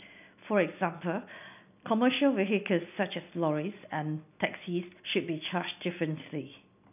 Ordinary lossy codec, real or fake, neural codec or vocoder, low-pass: none; real; none; 3.6 kHz